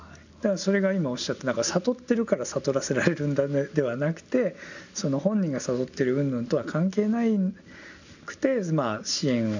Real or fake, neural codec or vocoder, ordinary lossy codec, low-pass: real; none; none; 7.2 kHz